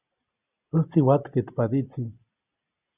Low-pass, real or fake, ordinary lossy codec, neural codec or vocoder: 3.6 kHz; real; Opus, 64 kbps; none